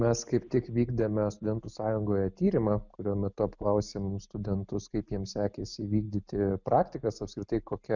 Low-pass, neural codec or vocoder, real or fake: 7.2 kHz; none; real